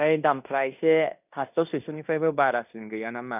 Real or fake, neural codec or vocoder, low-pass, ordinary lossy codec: fake; codec, 16 kHz in and 24 kHz out, 0.9 kbps, LongCat-Audio-Codec, fine tuned four codebook decoder; 3.6 kHz; none